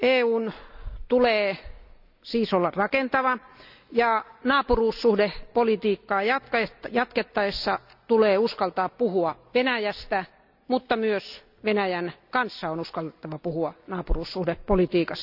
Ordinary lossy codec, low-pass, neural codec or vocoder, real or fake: none; 5.4 kHz; none; real